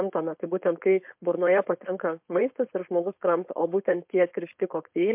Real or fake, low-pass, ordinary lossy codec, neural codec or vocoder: fake; 3.6 kHz; MP3, 32 kbps; codec, 16 kHz, 4.8 kbps, FACodec